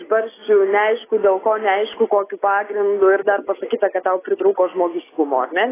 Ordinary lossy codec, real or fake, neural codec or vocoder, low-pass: AAC, 16 kbps; real; none; 3.6 kHz